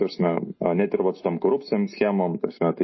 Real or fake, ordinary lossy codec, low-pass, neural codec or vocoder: real; MP3, 24 kbps; 7.2 kHz; none